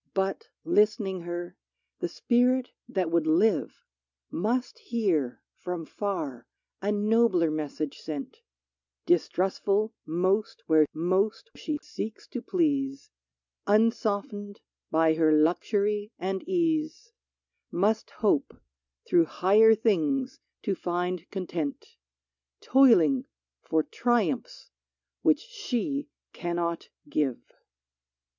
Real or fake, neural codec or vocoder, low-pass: real; none; 7.2 kHz